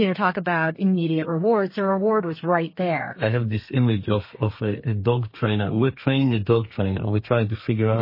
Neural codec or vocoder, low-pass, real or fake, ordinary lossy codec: codec, 32 kHz, 1.9 kbps, SNAC; 5.4 kHz; fake; MP3, 24 kbps